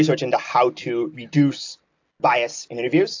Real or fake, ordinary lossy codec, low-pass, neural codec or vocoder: real; AAC, 48 kbps; 7.2 kHz; none